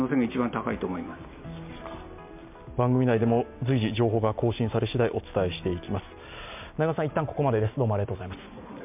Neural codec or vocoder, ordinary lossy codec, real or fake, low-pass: none; MP3, 32 kbps; real; 3.6 kHz